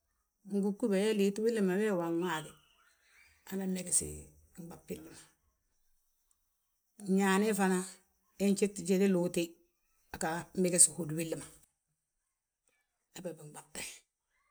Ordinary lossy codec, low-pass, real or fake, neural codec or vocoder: none; none; real; none